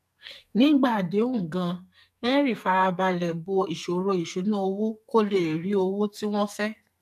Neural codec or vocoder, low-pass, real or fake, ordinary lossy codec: codec, 44.1 kHz, 2.6 kbps, SNAC; 14.4 kHz; fake; none